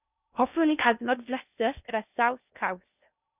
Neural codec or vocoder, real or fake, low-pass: codec, 16 kHz in and 24 kHz out, 0.6 kbps, FocalCodec, streaming, 2048 codes; fake; 3.6 kHz